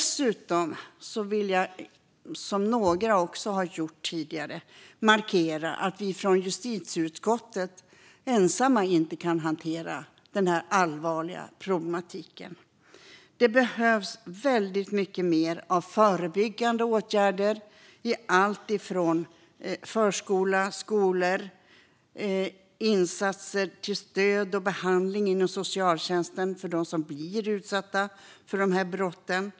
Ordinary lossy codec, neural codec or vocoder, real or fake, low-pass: none; none; real; none